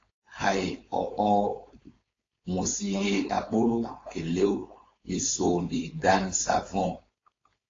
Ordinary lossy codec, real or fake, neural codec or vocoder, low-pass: AAC, 32 kbps; fake; codec, 16 kHz, 4.8 kbps, FACodec; 7.2 kHz